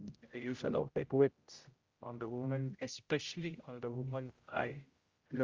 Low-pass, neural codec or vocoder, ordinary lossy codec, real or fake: 7.2 kHz; codec, 16 kHz, 0.5 kbps, X-Codec, HuBERT features, trained on general audio; Opus, 32 kbps; fake